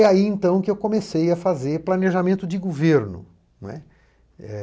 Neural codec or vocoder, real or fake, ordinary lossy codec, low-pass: none; real; none; none